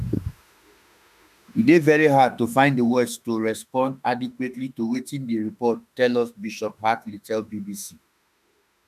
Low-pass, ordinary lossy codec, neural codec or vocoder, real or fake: 14.4 kHz; none; autoencoder, 48 kHz, 32 numbers a frame, DAC-VAE, trained on Japanese speech; fake